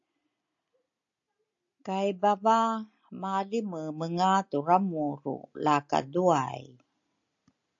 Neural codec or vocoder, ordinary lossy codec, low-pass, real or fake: none; AAC, 64 kbps; 7.2 kHz; real